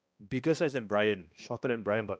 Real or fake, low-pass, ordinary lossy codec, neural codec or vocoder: fake; none; none; codec, 16 kHz, 1 kbps, X-Codec, HuBERT features, trained on balanced general audio